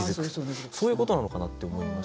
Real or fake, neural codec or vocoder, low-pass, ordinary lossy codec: real; none; none; none